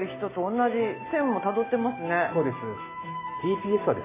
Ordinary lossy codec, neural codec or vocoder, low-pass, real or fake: MP3, 16 kbps; none; 3.6 kHz; real